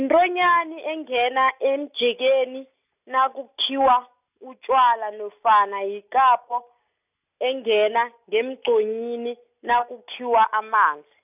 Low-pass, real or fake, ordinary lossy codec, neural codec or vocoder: 3.6 kHz; real; none; none